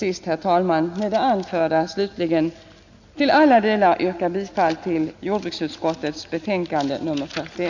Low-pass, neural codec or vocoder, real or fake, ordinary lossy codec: 7.2 kHz; none; real; none